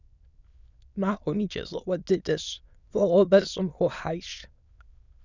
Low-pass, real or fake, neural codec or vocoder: 7.2 kHz; fake; autoencoder, 22.05 kHz, a latent of 192 numbers a frame, VITS, trained on many speakers